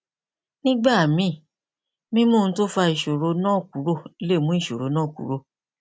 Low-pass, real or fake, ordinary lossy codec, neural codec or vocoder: none; real; none; none